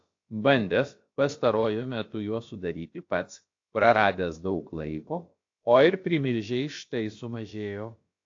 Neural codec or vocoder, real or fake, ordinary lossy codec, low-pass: codec, 16 kHz, about 1 kbps, DyCAST, with the encoder's durations; fake; AAC, 48 kbps; 7.2 kHz